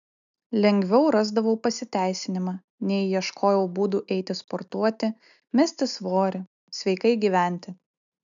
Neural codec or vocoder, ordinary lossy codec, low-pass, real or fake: none; MP3, 96 kbps; 7.2 kHz; real